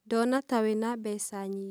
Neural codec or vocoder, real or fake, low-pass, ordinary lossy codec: none; real; none; none